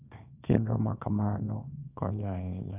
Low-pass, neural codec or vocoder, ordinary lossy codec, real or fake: 3.6 kHz; codec, 24 kHz, 0.9 kbps, WavTokenizer, small release; none; fake